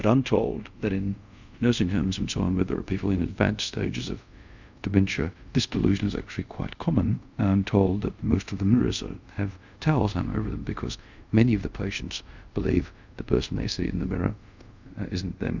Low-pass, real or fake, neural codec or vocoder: 7.2 kHz; fake; codec, 24 kHz, 0.5 kbps, DualCodec